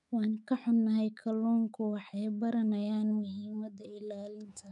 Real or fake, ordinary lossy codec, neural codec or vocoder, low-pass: fake; none; autoencoder, 48 kHz, 128 numbers a frame, DAC-VAE, trained on Japanese speech; 10.8 kHz